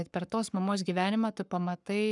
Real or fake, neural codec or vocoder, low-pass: fake; codec, 44.1 kHz, 7.8 kbps, Pupu-Codec; 10.8 kHz